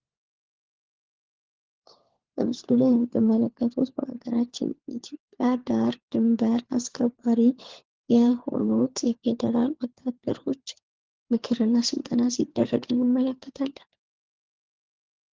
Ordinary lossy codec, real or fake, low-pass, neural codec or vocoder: Opus, 16 kbps; fake; 7.2 kHz; codec, 16 kHz, 4 kbps, FunCodec, trained on LibriTTS, 50 frames a second